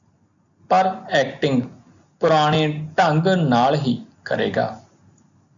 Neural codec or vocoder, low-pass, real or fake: none; 7.2 kHz; real